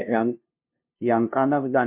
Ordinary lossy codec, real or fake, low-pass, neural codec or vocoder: MP3, 32 kbps; fake; 3.6 kHz; codec, 16 kHz, 0.5 kbps, FunCodec, trained on LibriTTS, 25 frames a second